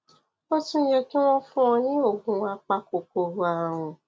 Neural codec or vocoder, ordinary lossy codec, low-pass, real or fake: none; none; none; real